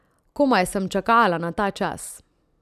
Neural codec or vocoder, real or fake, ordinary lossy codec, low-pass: none; real; none; 14.4 kHz